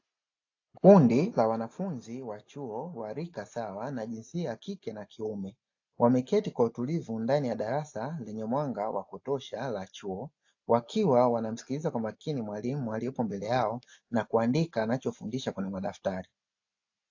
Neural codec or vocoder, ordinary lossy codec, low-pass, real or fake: none; AAC, 48 kbps; 7.2 kHz; real